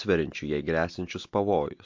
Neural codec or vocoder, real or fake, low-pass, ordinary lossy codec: none; real; 7.2 kHz; MP3, 48 kbps